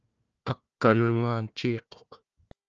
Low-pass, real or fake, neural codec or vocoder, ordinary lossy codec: 7.2 kHz; fake; codec, 16 kHz, 1 kbps, FunCodec, trained on Chinese and English, 50 frames a second; Opus, 24 kbps